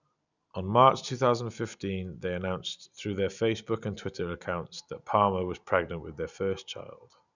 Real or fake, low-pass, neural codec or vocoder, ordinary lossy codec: real; 7.2 kHz; none; none